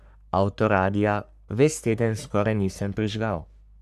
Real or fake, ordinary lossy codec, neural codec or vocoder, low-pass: fake; none; codec, 44.1 kHz, 3.4 kbps, Pupu-Codec; 14.4 kHz